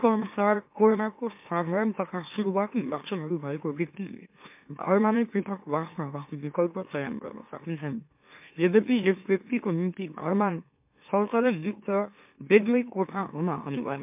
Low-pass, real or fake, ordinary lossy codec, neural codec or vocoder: 3.6 kHz; fake; MP3, 32 kbps; autoencoder, 44.1 kHz, a latent of 192 numbers a frame, MeloTTS